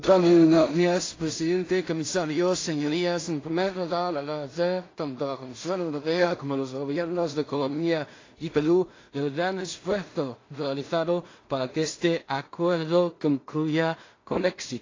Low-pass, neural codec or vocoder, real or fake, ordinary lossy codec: 7.2 kHz; codec, 16 kHz in and 24 kHz out, 0.4 kbps, LongCat-Audio-Codec, two codebook decoder; fake; AAC, 32 kbps